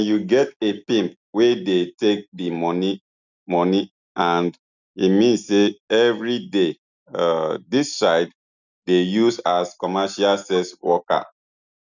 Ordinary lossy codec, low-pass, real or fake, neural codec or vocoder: none; 7.2 kHz; real; none